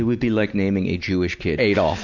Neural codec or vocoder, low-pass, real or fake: none; 7.2 kHz; real